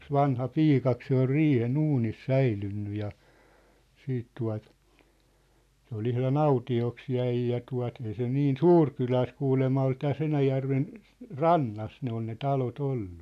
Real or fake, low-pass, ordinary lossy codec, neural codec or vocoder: real; 14.4 kHz; none; none